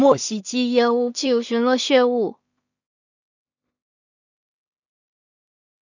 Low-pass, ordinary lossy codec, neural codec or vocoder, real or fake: 7.2 kHz; none; codec, 16 kHz in and 24 kHz out, 0.4 kbps, LongCat-Audio-Codec, two codebook decoder; fake